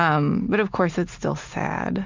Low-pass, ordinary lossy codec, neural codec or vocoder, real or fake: 7.2 kHz; MP3, 64 kbps; none; real